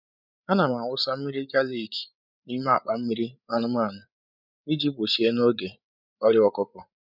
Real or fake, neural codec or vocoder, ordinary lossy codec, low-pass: fake; codec, 16 kHz, 8 kbps, FreqCodec, larger model; none; 5.4 kHz